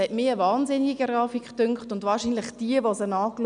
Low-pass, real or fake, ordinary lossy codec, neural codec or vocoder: 9.9 kHz; real; none; none